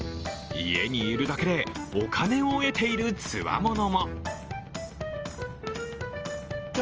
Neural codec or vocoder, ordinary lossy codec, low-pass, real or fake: none; Opus, 24 kbps; 7.2 kHz; real